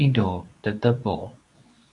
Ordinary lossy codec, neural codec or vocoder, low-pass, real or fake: AAC, 64 kbps; codec, 24 kHz, 0.9 kbps, WavTokenizer, medium speech release version 2; 10.8 kHz; fake